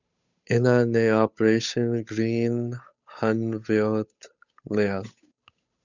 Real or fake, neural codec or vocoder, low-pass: fake; codec, 16 kHz, 8 kbps, FunCodec, trained on Chinese and English, 25 frames a second; 7.2 kHz